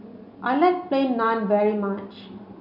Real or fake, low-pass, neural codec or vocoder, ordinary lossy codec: real; 5.4 kHz; none; none